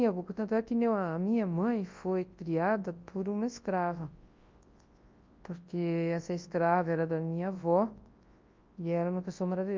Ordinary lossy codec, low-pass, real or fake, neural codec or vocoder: Opus, 24 kbps; 7.2 kHz; fake; codec, 24 kHz, 0.9 kbps, WavTokenizer, large speech release